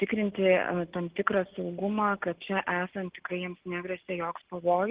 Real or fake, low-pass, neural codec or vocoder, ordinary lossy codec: real; 3.6 kHz; none; Opus, 16 kbps